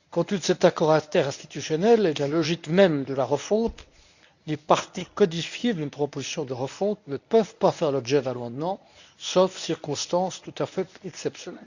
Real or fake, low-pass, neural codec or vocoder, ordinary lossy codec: fake; 7.2 kHz; codec, 24 kHz, 0.9 kbps, WavTokenizer, medium speech release version 1; none